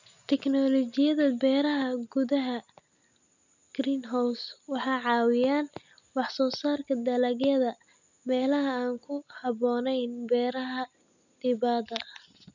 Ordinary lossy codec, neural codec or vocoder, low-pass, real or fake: none; none; 7.2 kHz; real